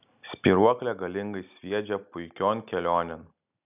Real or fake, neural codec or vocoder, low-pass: real; none; 3.6 kHz